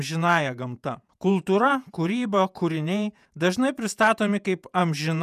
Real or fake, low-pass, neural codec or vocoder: fake; 14.4 kHz; vocoder, 48 kHz, 128 mel bands, Vocos